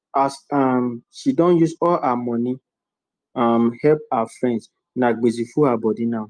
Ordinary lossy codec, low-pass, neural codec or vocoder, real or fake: Opus, 32 kbps; 9.9 kHz; none; real